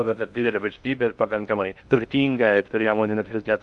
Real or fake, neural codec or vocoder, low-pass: fake; codec, 16 kHz in and 24 kHz out, 0.6 kbps, FocalCodec, streaming, 2048 codes; 10.8 kHz